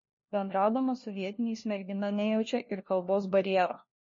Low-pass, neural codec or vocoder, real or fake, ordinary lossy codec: 7.2 kHz; codec, 16 kHz, 1 kbps, FunCodec, trained on LibriTTS, 50 frames a second; fake; MP3, 32 kbps